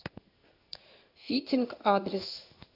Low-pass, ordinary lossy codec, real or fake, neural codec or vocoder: 5.4 kHz; AAC, 48 kbps; fake; codec, 16 kHz in and 24 kHz out, 1 kbps, XY-Tokenizer